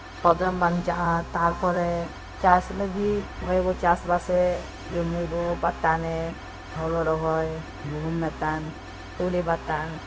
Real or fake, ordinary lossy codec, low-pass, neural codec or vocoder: fake; none; none; codec, 16 kHz, 0.4 kbps, LongCat-Audio-Codec